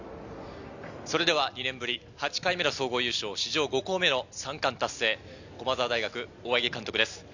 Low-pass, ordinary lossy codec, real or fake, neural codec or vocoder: 7.2 kHz; none; real; none